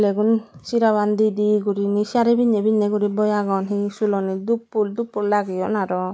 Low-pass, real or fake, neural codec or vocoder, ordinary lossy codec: none; real; none; none